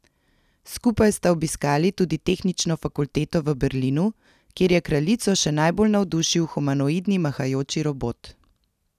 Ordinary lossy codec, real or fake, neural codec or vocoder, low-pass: none; real; none; 14.4 kHz